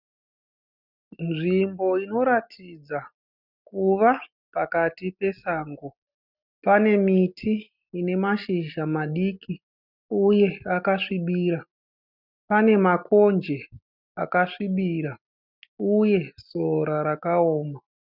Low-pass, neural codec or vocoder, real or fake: 5.4 kHz; none; real